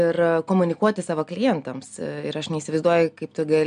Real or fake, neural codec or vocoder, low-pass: real; none; 9.9 kHz